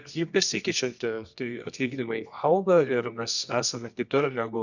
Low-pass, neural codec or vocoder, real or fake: 7.2 kHz; codec, 24 kHz, 0.9 kbps, WavTokenizer, medium music audio release; fake